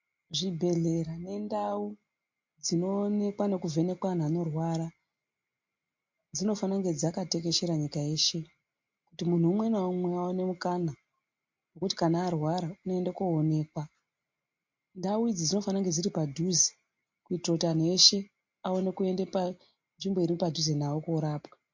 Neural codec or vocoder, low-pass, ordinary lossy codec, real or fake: none; 7.2 kHz; MP3, 48 kbps; real